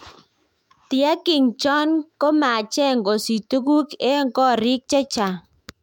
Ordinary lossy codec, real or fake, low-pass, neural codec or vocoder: MP3, 96 kbps; fake; 19.8 kHz; autoencoder, 48 kHz, 128 numbers a frame, DAC-VAE, trained on Japanese speech